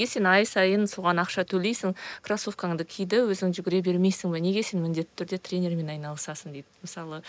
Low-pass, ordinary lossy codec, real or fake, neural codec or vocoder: none; none; real; none